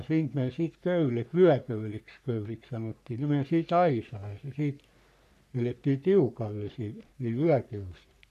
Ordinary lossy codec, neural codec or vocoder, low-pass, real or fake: none; codec, 44.1 kHz, 3.4 kbps, Pupu-Codec; 14.4 kHz; fake